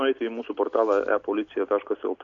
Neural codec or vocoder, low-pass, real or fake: none; 7.2 kHz; real